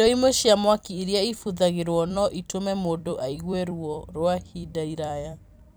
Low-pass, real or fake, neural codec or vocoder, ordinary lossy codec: none; fake; vocoder, 44.1 kHz, 128 mel bands every 256 samples, BigVGAN v2; none